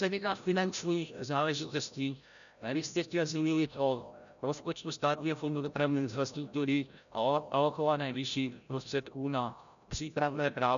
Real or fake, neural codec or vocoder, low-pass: fake; codec, 16 kHz, 0.5 kbps, FreqCodec, larger model; 7.2 kHz